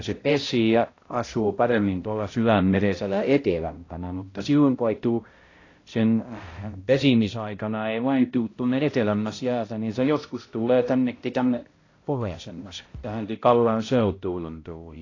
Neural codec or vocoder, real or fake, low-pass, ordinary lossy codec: codec, 16 kHz, 0.5 kbps, X-Codec, HuBERT features, trained on balanced general audio; fake; 7.2 kHz; AAC, 32 kbps